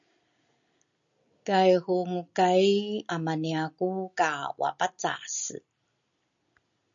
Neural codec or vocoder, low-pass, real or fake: none; 7.2 kHz; real